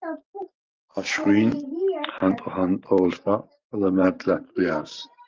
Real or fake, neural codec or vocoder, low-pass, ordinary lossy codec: fake; vocoder, 44.1 kHz, 128 mel bands every 512 samples, BigVGAN v2; 7.2 kHz; Opus, 32 kbps